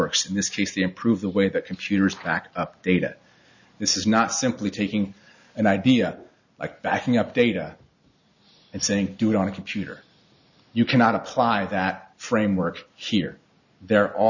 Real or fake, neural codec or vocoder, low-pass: real; none; 7.2 kHz